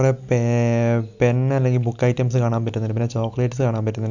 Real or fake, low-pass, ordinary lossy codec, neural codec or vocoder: real; 7.2 kHz; none; none